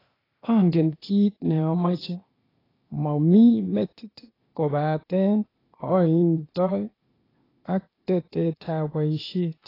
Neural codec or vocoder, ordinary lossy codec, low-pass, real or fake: codec, 16 kHz, 0.8 kbps, ZipCodec; AAC, 24 kbps; 5.4 kHz; fake